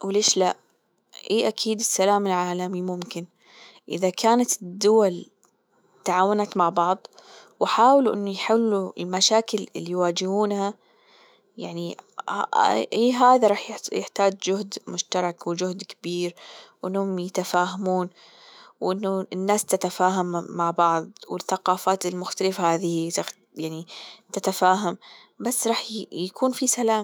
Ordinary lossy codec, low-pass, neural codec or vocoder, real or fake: none; none; autoencoder, 48 kHz, 128 numbers a frame, DAC-VAE, trained on Japanese speech; fake